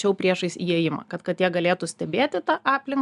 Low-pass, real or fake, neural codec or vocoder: 10.8 kHz; real; none